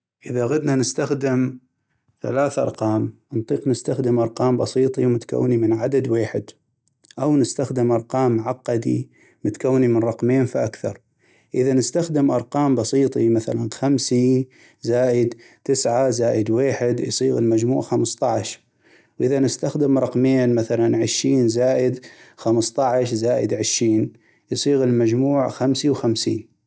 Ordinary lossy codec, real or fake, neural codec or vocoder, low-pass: none; real; none; none